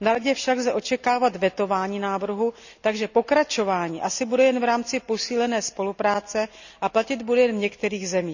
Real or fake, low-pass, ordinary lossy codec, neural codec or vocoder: real; 7.2 kHz; none; none